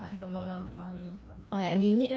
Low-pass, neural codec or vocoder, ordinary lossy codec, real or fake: none; codec, 16 kHz, 1 kbps, FreqCodec, larger model; none; fake